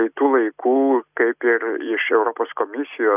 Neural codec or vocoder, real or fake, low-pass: none; real; 3.6 kHz